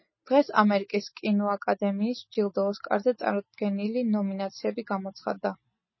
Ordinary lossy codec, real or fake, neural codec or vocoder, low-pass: MP3, 24 kbps; real; none; 7.2 kHz